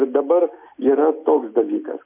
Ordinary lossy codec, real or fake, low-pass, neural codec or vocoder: MP3, 32 kbps; real; 3.6 kHz; none